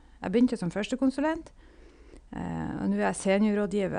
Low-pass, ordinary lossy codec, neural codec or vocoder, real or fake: 9.9 kHz; none; none; real